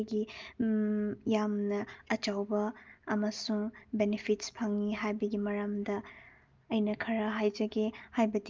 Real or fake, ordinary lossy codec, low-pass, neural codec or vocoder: real; Opus, 32 kbps; 7.2 kHz; none